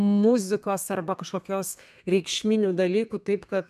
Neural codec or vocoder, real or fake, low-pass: codec, 32 kHz, 1.9 kbps, SNAC; fake; 14.4 kHz